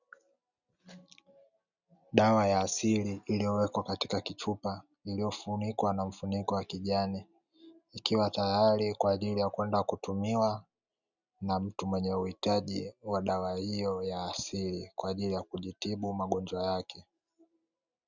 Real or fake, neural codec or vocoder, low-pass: real; none; 7.2 kHz